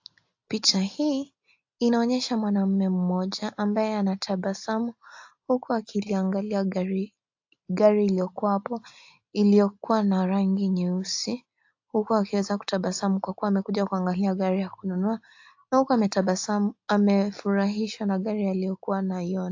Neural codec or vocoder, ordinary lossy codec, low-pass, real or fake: none; AAC, 48 kbps; 7.2 kHz; real